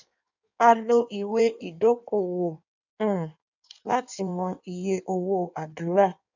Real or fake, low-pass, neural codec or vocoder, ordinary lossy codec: fake; 7.2 kHz; codec, 16 kHz in and 24 kHz out, 1.1 kbps, FireRedTTS-2 codec; none